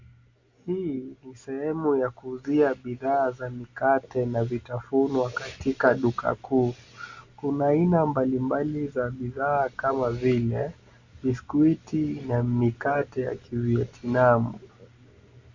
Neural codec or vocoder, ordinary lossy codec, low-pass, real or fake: none; Opus, 64 kbps; 7.2 kHz; real